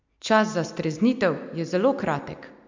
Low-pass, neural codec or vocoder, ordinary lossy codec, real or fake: 7.2 kHz; none; MP3, 64 kbps; real